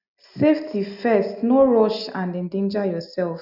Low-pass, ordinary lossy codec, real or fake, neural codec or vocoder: 5.4 kHz; none; real; none